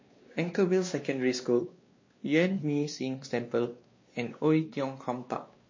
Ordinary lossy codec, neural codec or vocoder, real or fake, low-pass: MP3, 32 kbps; codec, 16 kHz, 2 kbps, X-Codec, WavLM features, trained on Multilingual LibriSpeech; fake; 7.2 kHz